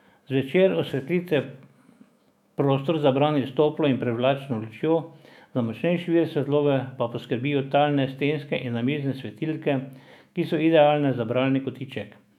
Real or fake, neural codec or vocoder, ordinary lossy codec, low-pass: fake; autoencoder, 48 kHz, 128 numbers a frame, DAC-VAE, trained on Japanese speech; none; 19.8 kHz